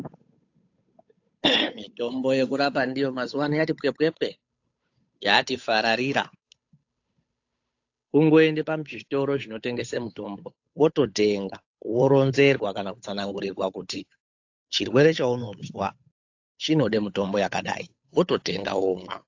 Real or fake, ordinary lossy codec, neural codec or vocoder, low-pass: fake; AAC, 48 kbps; codec, 16 kHz, 8 kbps, FunCodec, trained on Chinese and English, 25 frames a second; 7.2 kHz